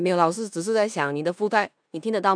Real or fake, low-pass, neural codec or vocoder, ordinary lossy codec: fake; 9.9 kHz; codec, 16 kHz in and 24 kHz out, 0.9 kbps, LongCat-Audio-Codec, four codebook decoder; none